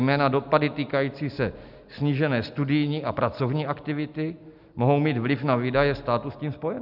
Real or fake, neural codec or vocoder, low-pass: real; none; 5.4 kHz